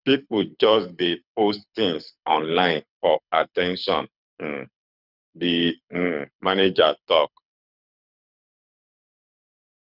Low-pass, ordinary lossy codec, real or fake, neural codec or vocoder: 5.4 kHz; none; fake; codec, 24 kHz, 6 kbps, HILCodec